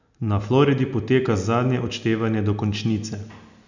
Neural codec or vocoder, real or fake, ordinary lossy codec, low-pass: none; real; none; 7.2 kHz